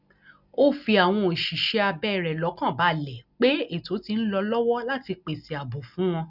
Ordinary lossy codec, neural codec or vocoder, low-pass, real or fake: none; none; 5.4 kHz; real